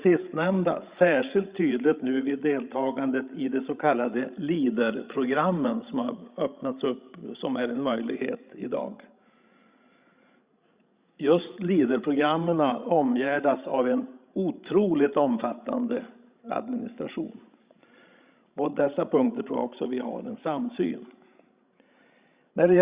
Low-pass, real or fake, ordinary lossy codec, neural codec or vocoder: 3.6 kHz; fake; Opus, 64 kbps; codec, 16 kHz, 16 kbps, FreqCodec, larger model